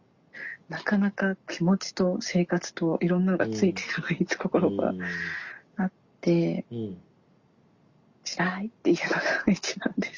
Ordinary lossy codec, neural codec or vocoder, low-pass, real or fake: Opus, 64 kbps; none; 7.2 kHz; real